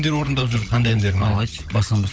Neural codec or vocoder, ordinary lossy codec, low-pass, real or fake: codec, 16 kHz, 16 kbps, FunCodec, trained on LibriTTS, 50 frames a second; none; none; fake